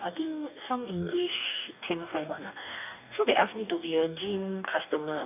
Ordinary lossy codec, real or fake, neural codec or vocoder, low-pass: none; fake; codec, 44.1 kHz, 2.6 kbps, DAC; 3.6 kHz